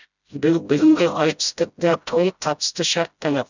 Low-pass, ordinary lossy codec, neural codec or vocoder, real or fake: 7.2 kHz; none; codec, 16 kHz, 0.5 kbps, FreqCodec, smaller model; fake